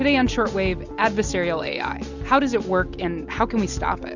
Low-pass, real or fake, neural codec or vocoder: 7.2 kHz; real; none